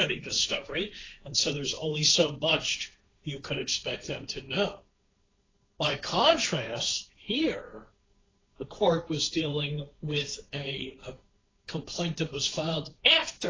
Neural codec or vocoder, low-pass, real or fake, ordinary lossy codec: codec, 16 kHz, 1.1 kbps, Voila-Tokenizer; 7.2 kHz; fake; AAC, 32 kbps